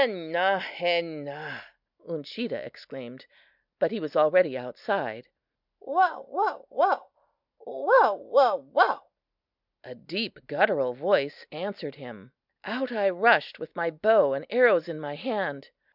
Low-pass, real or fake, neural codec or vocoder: 5.4 kHz; real; none